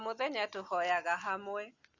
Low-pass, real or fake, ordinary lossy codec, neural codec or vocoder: 7.2 kHz; real; Opus, 64 kbps; none